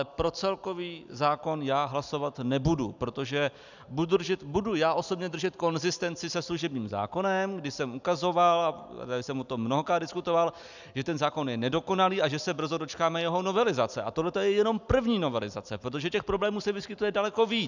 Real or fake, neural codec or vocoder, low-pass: real; none; 7.2 kHz